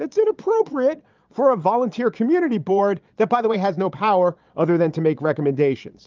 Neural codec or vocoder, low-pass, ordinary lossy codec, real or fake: vocoder, 22.05 kHz, 80 mel bands, Vocos; 7.2 kHz; Opus, 24 kbps; fake